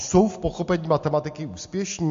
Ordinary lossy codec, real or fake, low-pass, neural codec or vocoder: MP3, 48 kbps; real; 7.2 kHz; none